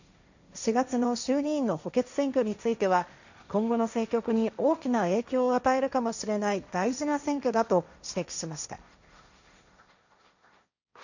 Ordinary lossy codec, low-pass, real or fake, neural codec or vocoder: none; 7.2 kHz; fake; codec, 16 kHz, 1.1 kbps, Voila-Tokenizer